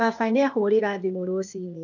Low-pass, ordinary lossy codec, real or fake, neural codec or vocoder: 7.2 kHz; none; fake; codec, 16 kHz, 0.8 kbps, ZipCodec